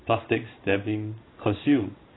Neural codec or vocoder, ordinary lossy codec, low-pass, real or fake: codec, 16 kHz in and 24 kHz out, 1 kbps, XY-Tokenizer; AAC, 16 kbps; 7.2 kHz; fake